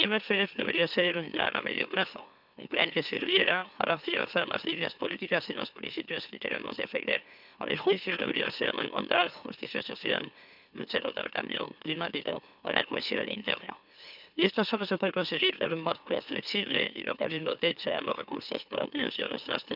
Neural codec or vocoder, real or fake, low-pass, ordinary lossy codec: autoencoder, 44.1 kHz, a latent of 192 numbers a frame, MeloTTS; fake; 5.4 kHz; none